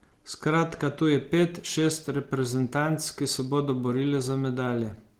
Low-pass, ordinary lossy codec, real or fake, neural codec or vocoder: 19.8 kHz; Opus, 16 kbps; real; none